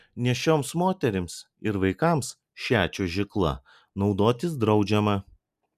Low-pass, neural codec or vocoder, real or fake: 14.4 kHz; none; real